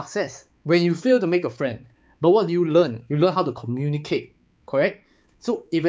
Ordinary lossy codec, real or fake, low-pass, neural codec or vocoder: none; fake; none; codec, 16 kHz, 4 kbps, X-Codec, HuBERT features, trained on balanced general audio